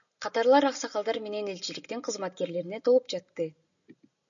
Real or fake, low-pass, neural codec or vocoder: real; 7.2 kHz; none